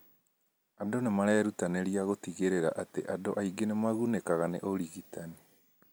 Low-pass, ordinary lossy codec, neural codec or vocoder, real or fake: none; none; none; real